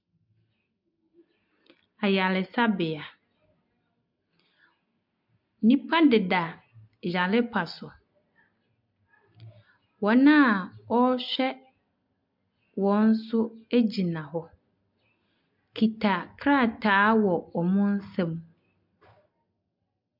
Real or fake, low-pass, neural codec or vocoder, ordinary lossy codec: real; 5.4 kHz; none; MP3, 48 kbps